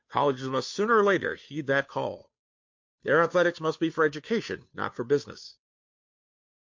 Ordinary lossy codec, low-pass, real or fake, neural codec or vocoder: MP3, 48 kbps; 7.2 kHz; fake; codec, 16 kHz, 2 kbps, FunCodec, trained on Chinese and English, 25 frames a second